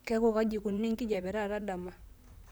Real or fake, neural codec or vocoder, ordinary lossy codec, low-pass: fake; vocoder, 44.1 kHz, 128 mel bands, Pupu-Vocoder; none; none